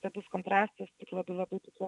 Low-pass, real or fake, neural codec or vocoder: 10.8 kHz; fake; vocoder, 24 kHz, 100 mel bands, Vocos